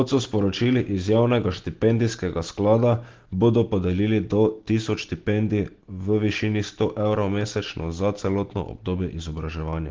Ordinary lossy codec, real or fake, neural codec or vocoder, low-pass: Opus, 16 kbps; real; none; 7.2 kHz